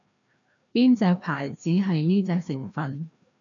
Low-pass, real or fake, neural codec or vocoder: 7.2 kHz; fake; codec, 16 kHz, 1 kbps, FreqCodec, larger model